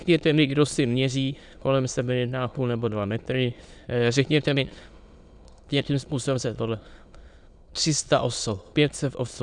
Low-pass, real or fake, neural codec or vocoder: 9.9 kHz; fake; autoencoder, 22.05 kHz, a latent of 192 numbers a frame, VITS, trained on many speakers